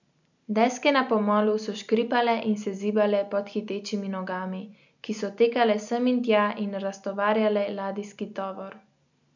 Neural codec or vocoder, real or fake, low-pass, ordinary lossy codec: none; real; 7.2 kHz; none